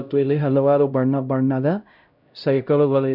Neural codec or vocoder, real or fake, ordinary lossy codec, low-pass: codec, 16 kHz, 0.5 kbps, X-Codec, HuBERT features, trained on LibriSpeech; fake; none; 5.4 kHz